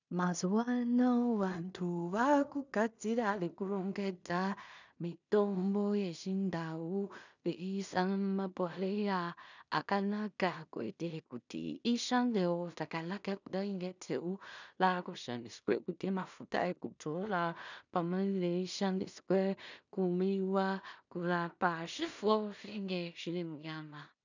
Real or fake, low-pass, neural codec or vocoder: fake; 7.2 kHz; codec, 16 kHz in and 24 kHz out, 0.4 kbps, LongCat-Audio-Codec, two codebook decoder